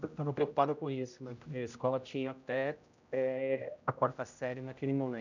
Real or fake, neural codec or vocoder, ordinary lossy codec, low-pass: fake; codec, 16 kHz, 0.5 kbps, X-Codec, HuBERT features, trained on general audio; none; 7.2 kHz